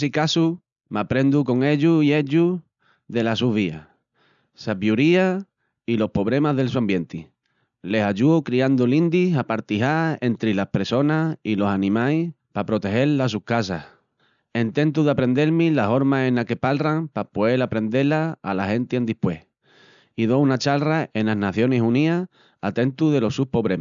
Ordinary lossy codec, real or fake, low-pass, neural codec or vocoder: none; real; 7.2 kHz; none